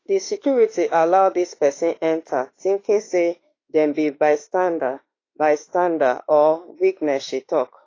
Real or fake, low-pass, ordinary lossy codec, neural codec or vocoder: fake; 7.2 kHz; AAC, 32 kbps; autoencoder, 48 kHz, 32 numbers a frame, DAC-VAE, trained on Japanese speech